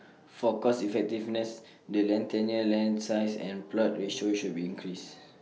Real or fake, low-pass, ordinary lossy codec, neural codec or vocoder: real; none; none; none